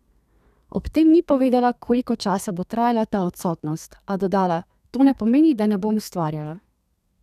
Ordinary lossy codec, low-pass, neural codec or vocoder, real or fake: none; 14.4 kHz; codec, 32 kHz, 1.9 kbps, SNAC; fake